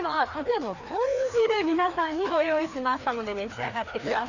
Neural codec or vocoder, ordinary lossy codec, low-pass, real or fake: codec, 16 kHz, 2 kbps, FreqCodec, larger model; none; 7.2 kHz; fake